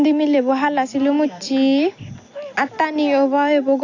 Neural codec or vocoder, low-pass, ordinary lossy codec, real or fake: none; 7.2 kHz; AAC, 48 kbps; real